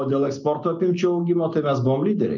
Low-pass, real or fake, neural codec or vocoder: 7.2 kHz; real; none